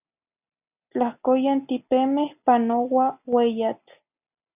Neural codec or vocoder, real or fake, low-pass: none; real; 3.6 kHz